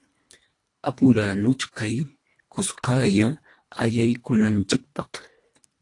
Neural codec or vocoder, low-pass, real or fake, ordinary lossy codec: codec, 24 kHz, 1.5 kbps, HILCodec; 10.8 kHz; fake; AAC, 48 kbps